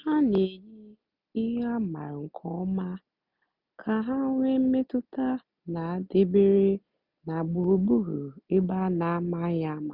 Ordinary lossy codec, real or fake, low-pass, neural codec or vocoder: none; real; 5.4 kHz; none